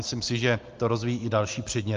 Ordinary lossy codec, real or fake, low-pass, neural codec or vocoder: Opus, 24 kbps; real; 7.2 kHz; none